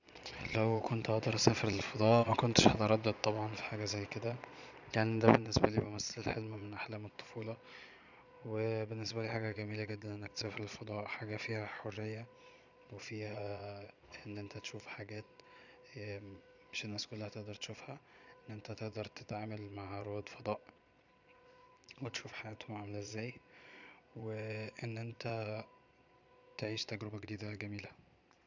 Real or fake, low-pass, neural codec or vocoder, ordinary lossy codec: fake; 7.2 kHz; vocoder, 22.05 kHz, 80 mel bands, Vocos; none